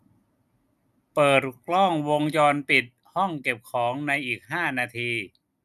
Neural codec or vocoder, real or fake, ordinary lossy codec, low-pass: none; real; none; 14.4 kHz